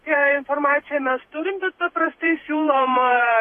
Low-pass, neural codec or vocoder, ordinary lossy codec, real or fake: 14.4 kHz; vocoder, 48 kHz, 128 mel bands, Vocos; AAC, 48 kbps; fake